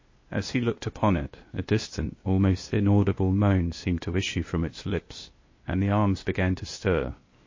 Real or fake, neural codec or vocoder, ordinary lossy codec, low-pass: fake; codec, 16 kHz, 0.8 kbps, ZipCodec; MP3, 32 kbps; 7.2 kHz